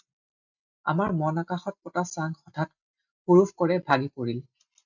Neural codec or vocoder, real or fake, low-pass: none; real; 7.2 kHz